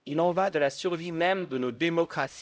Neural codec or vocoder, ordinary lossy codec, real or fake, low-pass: codec, 16 kHz, 0.5 kbps, X-Codec, HuBERT features, trained on LibriSpeech; none; fake; none